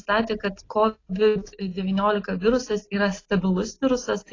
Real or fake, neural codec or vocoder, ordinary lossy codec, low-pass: real; none; AAC, 32 kbps; 7.2 kHz